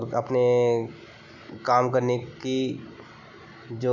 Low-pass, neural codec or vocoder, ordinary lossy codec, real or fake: 7.2 kHz; none; none; real